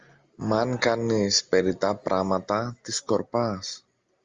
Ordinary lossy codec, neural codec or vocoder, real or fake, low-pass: Opus, 32 kbps; none; real; 7.2 kHz